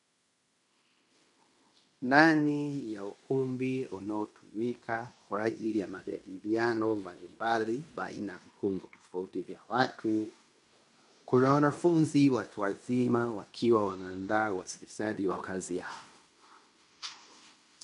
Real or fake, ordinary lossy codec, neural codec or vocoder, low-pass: fake; MP3, 64 kbps; codec, 16 kHz in and 24 kHz out, 0.9 kbps, LongCat-Audio-Codec, fine tuned four codebook decoder; 10.8 kHz